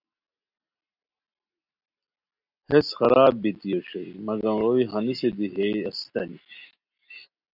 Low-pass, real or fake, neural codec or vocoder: 5.4 kHz; real; none